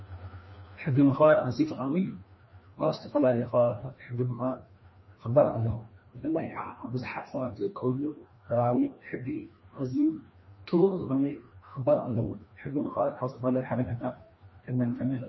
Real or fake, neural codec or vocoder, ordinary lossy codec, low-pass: fake; codec, 16 kHz, 1 kbps, FreqCodec, larger model; MP3, 24 kbps; 7.2 kHz